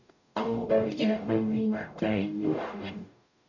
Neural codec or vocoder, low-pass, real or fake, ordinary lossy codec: codec, 44.1 kHz, 0.9 kbps, DAC; 7.2 kHz; fake; none